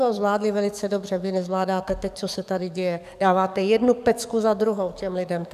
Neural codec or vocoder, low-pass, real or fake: codec, 44.1 kHz, 7.8 kbps, DAC; 14.4 kHz; fake